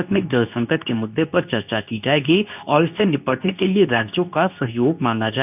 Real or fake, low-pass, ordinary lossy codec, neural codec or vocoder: fake; 3.6 kHz; none; codec, 24 kHz, 0.9 kbps, WavTokenizer, medium speech release version 2